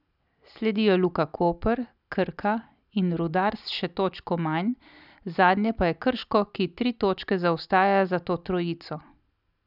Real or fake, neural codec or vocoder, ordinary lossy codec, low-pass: real; none; none; 5.4 kHz